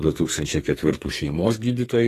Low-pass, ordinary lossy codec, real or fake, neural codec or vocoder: 14.4 kHz; AAC, 48 kbps; fake; codec, 44.1 kHz, 2.6 kbps, SNAC